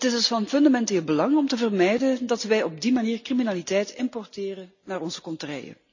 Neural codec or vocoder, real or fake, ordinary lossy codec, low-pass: none; real; none; 7.2 kHz